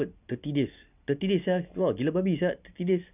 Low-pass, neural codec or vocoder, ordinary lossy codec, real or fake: 3.6 kHz; none; none; real